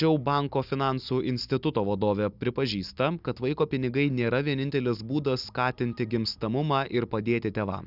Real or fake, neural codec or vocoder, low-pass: real; none; 5.4 kHz